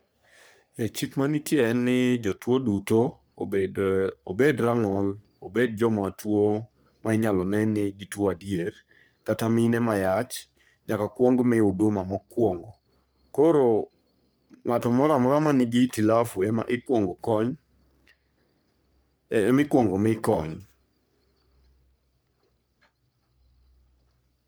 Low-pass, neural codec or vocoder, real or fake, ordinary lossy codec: none; codec, 44.1 kHz, 3.4 kbps, Pupu-Codec; fake; none